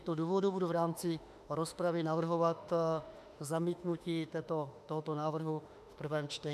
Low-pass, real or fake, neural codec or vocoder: 14.4 kHz; fake; autoencoder, 48 kHz, 32 numbers a frame, DAC-VAE, trained on Japanese speech